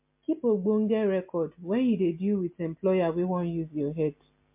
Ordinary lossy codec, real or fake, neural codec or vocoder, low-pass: MP3, 32 kbps; real; none; 3.6 kHz